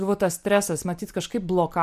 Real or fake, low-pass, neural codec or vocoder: real; 14.4 kHz; none